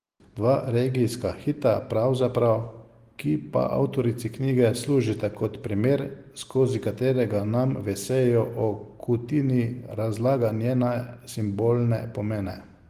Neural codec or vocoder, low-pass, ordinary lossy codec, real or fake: none; 14.4 kHz; Opus, 24 kbps; real